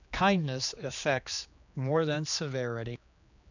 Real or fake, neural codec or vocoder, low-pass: fake; codec, 16 kHz, 2 kbps, X-Codec, HuBERT features, trained on general audio; 7.2 kHz